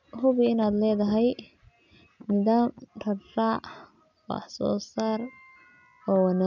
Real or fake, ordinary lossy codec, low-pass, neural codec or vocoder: real; none; 7.2 kHz; none